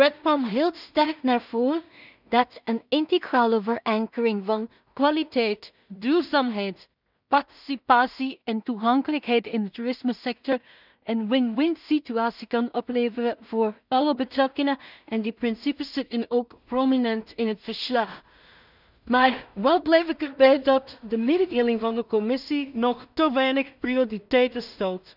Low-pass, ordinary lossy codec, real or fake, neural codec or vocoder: 5.4 kHz; none; fake; codec, 16 kHz in and 24 kHz out, 0.4 kbps, LongCat-Audio-Codec, two codebook decoder